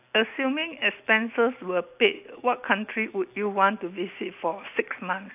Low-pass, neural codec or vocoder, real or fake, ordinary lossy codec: 3.6 kHz; none; real; AAC, 32 kbps